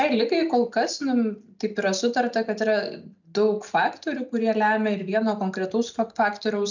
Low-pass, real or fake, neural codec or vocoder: 7.2 kHz; real; none